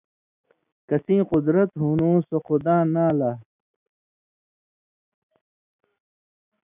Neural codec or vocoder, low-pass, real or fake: none; 3.6 kHz; real